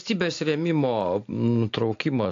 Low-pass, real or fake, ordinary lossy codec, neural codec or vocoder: 7.2 kHz; real; AAC, 48 kbps; none